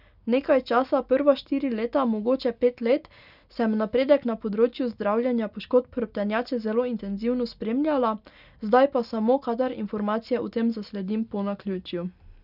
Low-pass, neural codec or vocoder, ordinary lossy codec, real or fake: 5.4 kHz; none; none; real